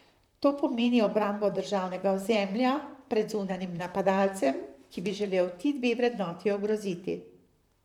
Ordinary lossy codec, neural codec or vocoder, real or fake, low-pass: none; vocoder, 44.1 kHz, 128 mel bands, Pupu-Vocoder; fake; 19.8 kHz